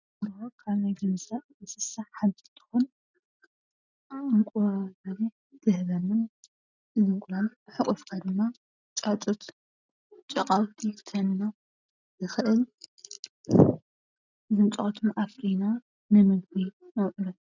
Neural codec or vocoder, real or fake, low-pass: none; real; 7.2 kHz